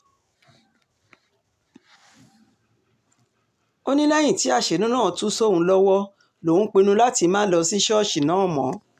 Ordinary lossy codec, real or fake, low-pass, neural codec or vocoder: none; real; 14.4 kHz; none